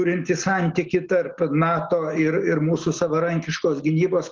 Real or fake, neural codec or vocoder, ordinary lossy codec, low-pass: real; none; Opus, 16 kbps; 7.2 kHz